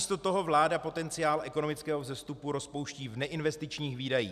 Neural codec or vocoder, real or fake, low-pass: none; real; 14.4 kHz